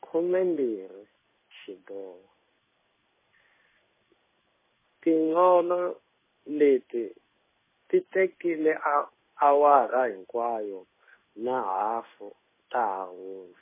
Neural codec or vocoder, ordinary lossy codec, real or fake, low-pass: codec, 16 kHz in and 24 kHz out, 1 kbps, XY-Tokenizer; MP3, 16 kbps; fake; 3.6 kHz